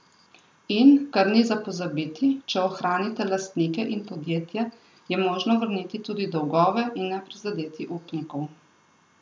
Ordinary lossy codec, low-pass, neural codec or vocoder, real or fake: none; none; none; real